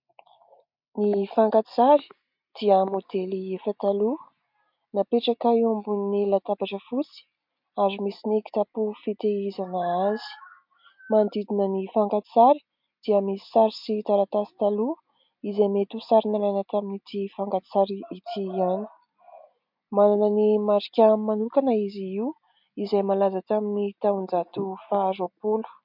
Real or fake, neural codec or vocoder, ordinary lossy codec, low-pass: real; none; MP3, 48 kbps; 5.4 kHz